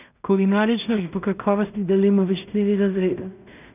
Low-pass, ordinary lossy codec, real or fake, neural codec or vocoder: 3.6 kHz; none; fake; codec, 16 kHz in and 24 kHz out, 0.4 kbps, LongCat-Audio-Codec, two codebook decoder